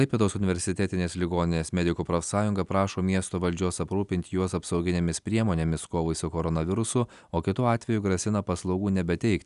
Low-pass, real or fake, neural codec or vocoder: 10.8 kHz; real; none